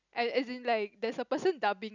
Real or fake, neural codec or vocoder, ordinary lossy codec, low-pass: real; none; none; 7.2 kHz